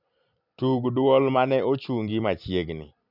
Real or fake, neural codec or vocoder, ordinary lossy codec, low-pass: real; none; none; 5.4 kHz